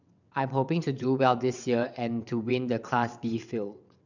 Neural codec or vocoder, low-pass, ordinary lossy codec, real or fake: vocoder, 22.05 kHz, 80 mel bands, WaveNeXt; 7.2 kHz; none; fake